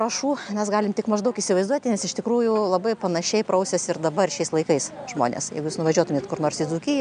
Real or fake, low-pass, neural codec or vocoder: real; 9.9 kHz; none